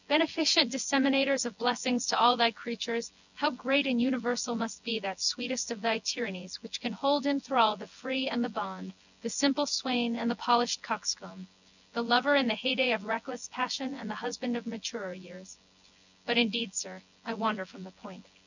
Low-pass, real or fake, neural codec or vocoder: 7.2 kHz; fake; vocoder, 24 kHz, 100 mel bands, Vocos